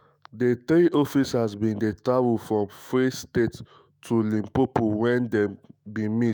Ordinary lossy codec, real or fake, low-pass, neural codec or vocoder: none; fake; none; autoencoder, 48 kHz, 128 numbers a frame, DAC-VAE, trained on Japanese speech